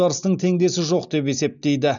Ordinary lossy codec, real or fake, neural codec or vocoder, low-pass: none; real; none; 7.2 kHz